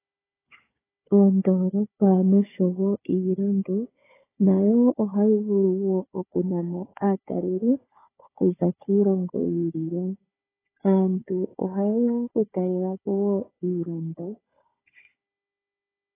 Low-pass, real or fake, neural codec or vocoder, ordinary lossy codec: 3.6 kHz; fake; codec, 16 kHz, 4 kbps, FunCodec, trained on Chinese and English, 50 frames a second; AAC, 16 kbps